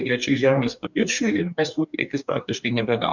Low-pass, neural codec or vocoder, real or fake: 7.2 kHz; codec, 44.1 kHz, 2.6 kbps, DAC; fake